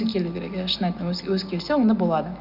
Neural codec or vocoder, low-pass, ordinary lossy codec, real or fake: none; 5.4 kHz; none; real